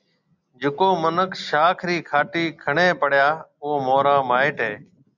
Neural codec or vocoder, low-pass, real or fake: none; 7.2 kHz; real